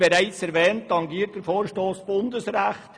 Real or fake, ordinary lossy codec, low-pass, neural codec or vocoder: real; none; 9.9 kHz; none